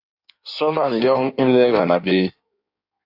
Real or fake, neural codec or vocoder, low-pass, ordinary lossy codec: fake; codec, 16 kHz in and 24 kHz out, 1.1 kbps, FireRedTTS-2 codec; 5.4 kHz; AAC, 48 kbps